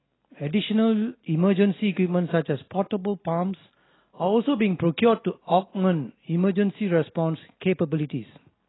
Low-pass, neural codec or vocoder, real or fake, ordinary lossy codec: 7.2 kHz; none; real; AAC, 16 kbps